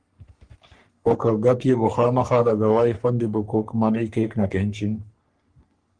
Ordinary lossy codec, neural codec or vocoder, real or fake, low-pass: Opus, 24 kbps; codec, 44.1 kHz, 3.4 kbps, Pupu-Codec; fake; 9.9 kHz